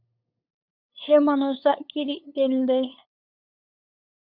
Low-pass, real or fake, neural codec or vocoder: 5.4 kHz; fake; codec, 16 kHz, 8 kbps, FunCodec, trained on LibriTTS, 25 frames a second